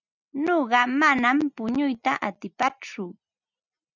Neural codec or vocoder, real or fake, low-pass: none; real; 7.2 kHz